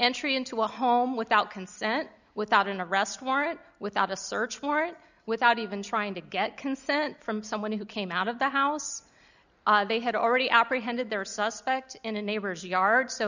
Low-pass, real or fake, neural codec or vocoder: 7.2 kHz; real; none